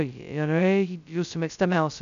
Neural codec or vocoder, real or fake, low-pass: codec, 16 kHz, 0.2 kbps, FocalCodec; fake; 7.2 kHz